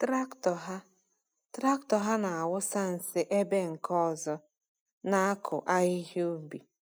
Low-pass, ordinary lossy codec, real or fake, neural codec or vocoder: none; none; real; none